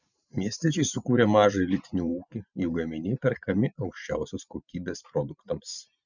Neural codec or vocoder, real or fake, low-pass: vocoder, 44.1 kHz, 128 mel bands every 512 samples, BigVGAN v2; fake; 7.2 kHz